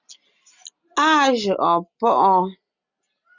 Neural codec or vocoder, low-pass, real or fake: none; 7.2 kHz; real